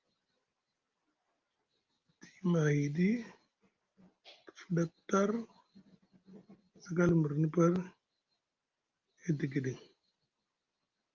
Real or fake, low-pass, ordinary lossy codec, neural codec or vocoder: real; 7.2 kHz; Opus, 32 kbps; none